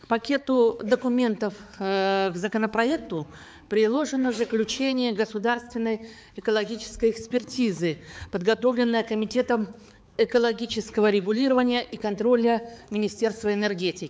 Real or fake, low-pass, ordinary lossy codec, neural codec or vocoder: fake; none; none; codec, 16 kHz, 4 kbps, X-Codec, HuBERT features, trained on balanced general audio